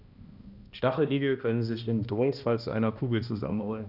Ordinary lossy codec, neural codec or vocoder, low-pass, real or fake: Opus, 64 kbps; codec, 16 kHz, 1 kbps, X-Codec, HuBERT features, trained on balanced general audio; 5.4 kHz; fake